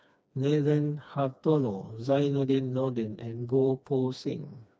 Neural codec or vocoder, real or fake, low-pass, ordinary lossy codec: codec, 16 kHz, 2 kbps, FreqCodec, smaller model; fake; none; none